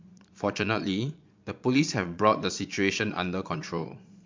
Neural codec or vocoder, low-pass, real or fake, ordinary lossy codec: vocoder, 22.05 kHz, 80 mel bands, Vocos; 7.2 kHz; fake; MP3, 64 kbps